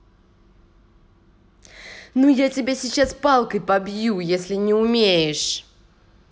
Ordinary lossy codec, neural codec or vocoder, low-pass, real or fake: none; none; none; real